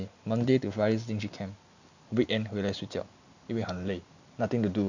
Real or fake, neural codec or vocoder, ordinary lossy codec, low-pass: real; none; none; 7.2 kHz